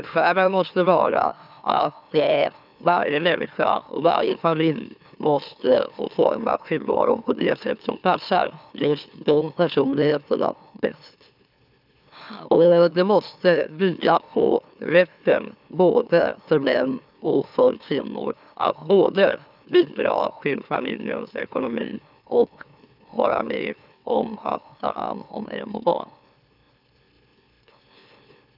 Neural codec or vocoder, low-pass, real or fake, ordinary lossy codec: autoencoder, 44.1 kHz, a latent of 192 numbers a frame, MeloTTS; 5.4 kHz; fake; none